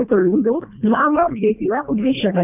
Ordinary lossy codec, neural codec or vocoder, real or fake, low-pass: none; codec, 24 kHz, 1.5 kbps, HILCodec; fake; 3.6 kHz